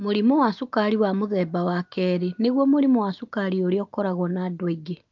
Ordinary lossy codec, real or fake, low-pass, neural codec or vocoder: Opus, 24 kbps; real; 7.2 kHz; none